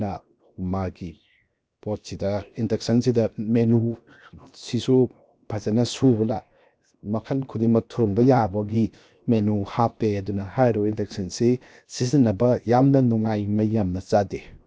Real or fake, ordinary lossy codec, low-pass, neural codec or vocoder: fake; none; none; codec, 16 kHz, 0.7 kbps, FocalCodec